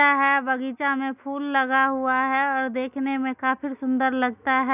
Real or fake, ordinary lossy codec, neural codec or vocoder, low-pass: real; none; none; 3.6 kHz